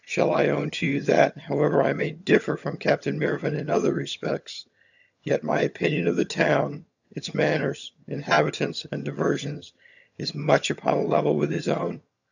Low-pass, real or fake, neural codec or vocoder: 7.2 kHz; fake; vocoder, 22.05 kHz, 80 mel bands, HiFi-GAN